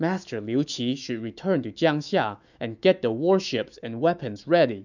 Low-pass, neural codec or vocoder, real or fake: 7.2 kHz; autoencoder, 48 kHz, 128 numbers a frame, DAC-VAE, trained on Japanese speech; fake